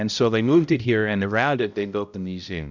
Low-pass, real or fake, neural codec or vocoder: 7.2 kHz; fake; codec, 16 kHz, 0.5 kbps, X-Codec, HuBERT features, trained on balanced general audio